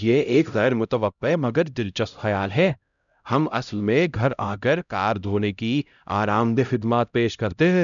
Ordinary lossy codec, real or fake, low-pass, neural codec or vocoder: none; fake; 7.2 kHz; codec, 16 kHz, 0.5 kbps, X-Codec, HuBERT features, trained on LibriSpeech